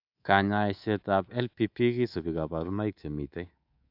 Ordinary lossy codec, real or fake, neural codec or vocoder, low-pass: AAC, 48 kbps; fake; codec, 24 kHz, 3.1 kbps, DualCodec; 5.4 kHz